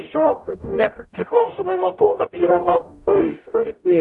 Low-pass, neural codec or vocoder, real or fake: 10.8 kHz; codec, 44.1 kHz, 0.9 kbps, DAC; fake